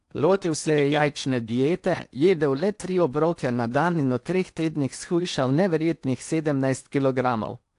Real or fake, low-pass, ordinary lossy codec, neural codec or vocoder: fake; 10.8 kHz; AAC, 64 kbps; codec, 16 kHz in and 24 kHz out, 0.8 kbps, FocalCodec, streaming, 65536 codes